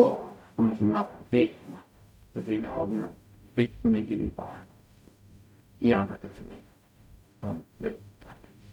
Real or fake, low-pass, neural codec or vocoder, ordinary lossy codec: fake; 19.8 kHz; codec, 44.1 kHz, 0.9 kbps, DAC; none